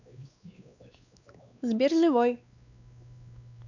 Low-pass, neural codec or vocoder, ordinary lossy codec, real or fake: 7.2 kHz; codec, 16 kHz, 2 kbps, X-Codec, WavLM features, trained on Multilingual LibriSpeech; none; fake